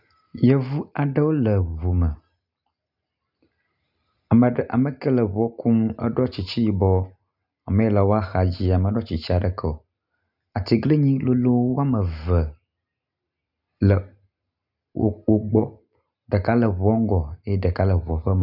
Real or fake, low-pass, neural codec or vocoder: real; 5.4 kHz; none